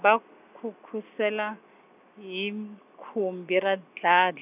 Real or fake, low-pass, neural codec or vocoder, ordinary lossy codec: real; 3.6 kHz; none; none